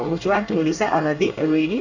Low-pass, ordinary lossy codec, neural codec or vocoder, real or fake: 7.2 kHz; none; codec, 24 kHz, 1 kbps, SNAC; fake